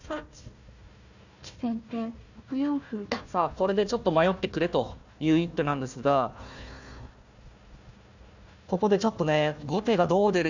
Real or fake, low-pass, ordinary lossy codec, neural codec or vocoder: fake; 7.2 kHz; none; codec, 16 kHz, 1 kbps, FunCodec, trained on Chinese and English, 50 frames a second